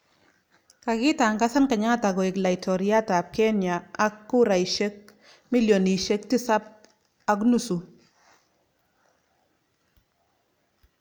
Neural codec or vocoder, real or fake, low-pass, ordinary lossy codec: none; real; none; none